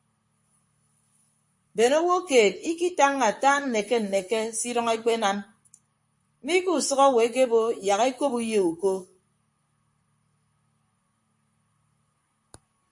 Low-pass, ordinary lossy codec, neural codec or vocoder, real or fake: 10.8 kHz; MP3, 48 kbps; vocoder, 44.1 kHz, 128 mel bands, Pupu-Vocoder; fake